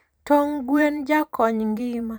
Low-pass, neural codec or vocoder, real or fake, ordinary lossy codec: none; vocoder, 44.1 kHz, 128 mel bands every 512 samples, BigVGAN v2; fake; none